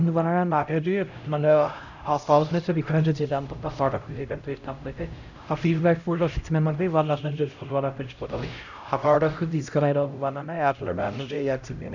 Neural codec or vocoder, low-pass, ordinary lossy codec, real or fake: codec, 16 kHz, 0.5 kbps, X-Codec, HuBERT features, trained on LibriSpeech; 7.2 kHz; none; fake